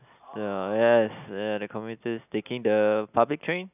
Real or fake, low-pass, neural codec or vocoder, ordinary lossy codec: real; 3.6 kHz; none; none